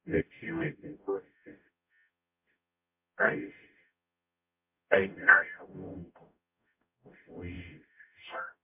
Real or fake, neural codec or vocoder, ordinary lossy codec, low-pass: fake; codec, 44.1 kHz, 0.9 kbps, DAC; MP3, 32 kbps; 3.6 kHz